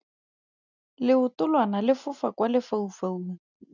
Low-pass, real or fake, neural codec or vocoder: 7.2 kHz; real; none